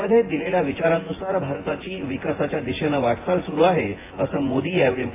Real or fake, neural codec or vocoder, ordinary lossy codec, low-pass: fake; vocoder, 24 kHz, 100 mel bands, Vocos; AAC, 16 kbps; 3.6 kHz